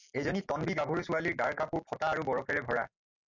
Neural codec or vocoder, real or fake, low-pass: none; real; 7.2 kHz